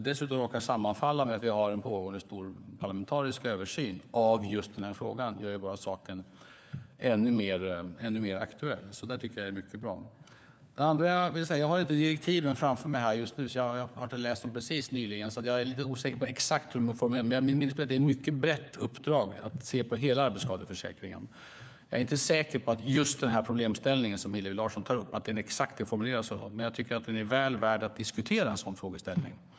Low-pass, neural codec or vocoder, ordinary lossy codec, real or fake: none; codec, 16 kHz, 4 kbps, FunCodec, trained on LibriTTS, 50 frames a second; none; fake